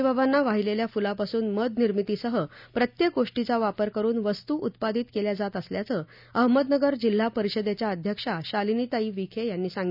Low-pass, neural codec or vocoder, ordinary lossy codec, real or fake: 5.4 kHz; none; none; real